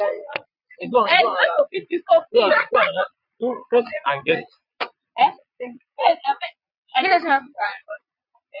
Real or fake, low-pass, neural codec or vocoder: fake; 5.4 kHz; vocoder, 22.05 kHz, 80 mel bands, Vocos